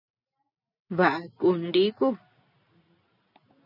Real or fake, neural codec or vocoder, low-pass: real; none; 5.4 kHz